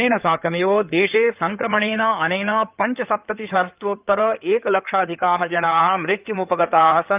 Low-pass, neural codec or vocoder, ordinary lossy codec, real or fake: 3.6 kHz; codec, 16 kHz in and 24 kHz out, 2.2 kbps, FireRedTTS-2 codec; Opus, 24 kbps; fake